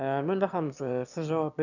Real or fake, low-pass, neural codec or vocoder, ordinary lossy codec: fake; 7.2 kHz; autoencoder, 22.05 kHz, a latent of 192 numbers a frame, VITS, trained on one speaker; none